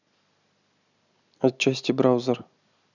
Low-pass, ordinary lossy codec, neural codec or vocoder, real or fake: 7.2 kHz; none; none; real